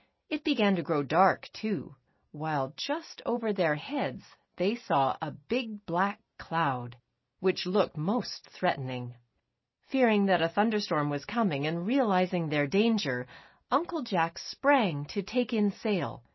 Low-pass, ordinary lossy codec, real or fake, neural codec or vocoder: 7.2 kHz; MP3, 24 kbps; real; none